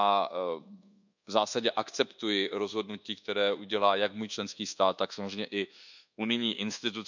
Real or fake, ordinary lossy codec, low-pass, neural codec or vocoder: fake; none; 7.2 kHz; codec, 24 kHz, 1.2 kbps, DualCodec